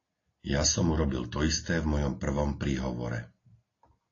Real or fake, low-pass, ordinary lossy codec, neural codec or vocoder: real; 7.2 kHz; AAC, 32 kbps; none